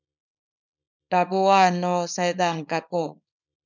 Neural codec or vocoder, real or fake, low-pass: codec, 24 kHz, 0.9 kbps, WavTokenizer, small release; fake; 7.2 kHz